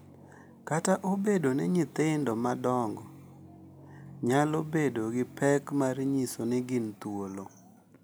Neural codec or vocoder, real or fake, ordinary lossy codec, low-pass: none; real; none; none